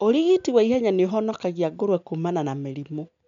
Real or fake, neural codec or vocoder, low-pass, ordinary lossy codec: real; none; 7.2 kHz; MP3, 64 kbps